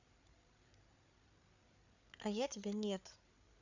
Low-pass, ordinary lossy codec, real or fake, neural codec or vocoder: 7.2 kHz; none; fake; codec, 16 kHz, 8 kbps, FreqCodec, larger model